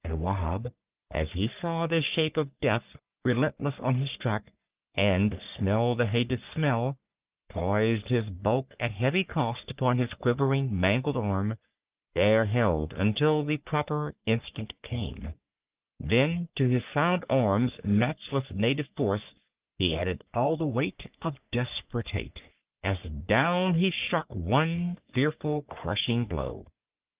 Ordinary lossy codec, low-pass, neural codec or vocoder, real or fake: Opus, 32 kbps; 3.6 kHz; codec, 44.1 kHz, 3.4 kbps, Pupu-Codec; fake